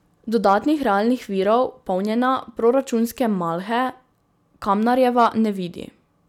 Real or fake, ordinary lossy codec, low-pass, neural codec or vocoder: real; none; 19.8 kHz; none